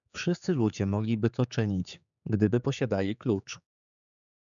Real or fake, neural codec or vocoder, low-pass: fake; codec, 16 kHz, 4 kbps, X-Codec, HuBERT features, trained on general audio; 7.2 kHz